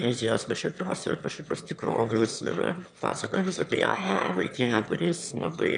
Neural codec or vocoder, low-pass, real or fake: autoencoder, 22.05 kHz, a latent of 192 numbers a frame, VITS, trained on one speaker; 9.9 kHz; fake